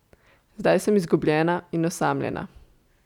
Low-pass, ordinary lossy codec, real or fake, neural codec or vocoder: 19.8 kHz; none; real; none